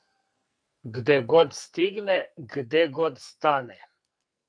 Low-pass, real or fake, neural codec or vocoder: 9.9 kHz; fake; codec, 44.1 kHz, 2.6 kbps, SNAC